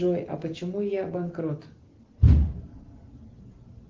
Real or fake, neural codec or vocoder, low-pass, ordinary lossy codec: real; none; 7.2 kHz; Opus, 16 kbps